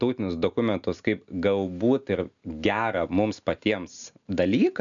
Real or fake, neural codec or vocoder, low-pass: real; none; 7.2 kHz